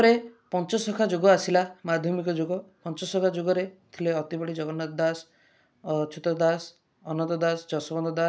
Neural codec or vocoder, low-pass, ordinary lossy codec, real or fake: none; none; none; real